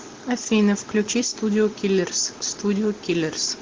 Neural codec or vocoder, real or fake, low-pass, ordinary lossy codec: none; real; 7.2 kHz; Opus, 16 kbps